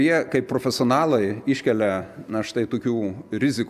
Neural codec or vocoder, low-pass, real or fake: none; 14.4 kHz; real